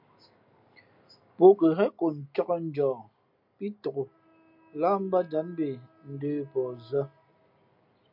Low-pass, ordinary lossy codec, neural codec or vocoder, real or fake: 5.4 kHz; MP3, 48 kbps; none; real